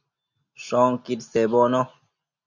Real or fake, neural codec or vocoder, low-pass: real; none; 7.2 kHz